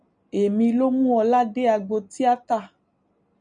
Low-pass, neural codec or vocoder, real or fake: 9.9 kHz; none; real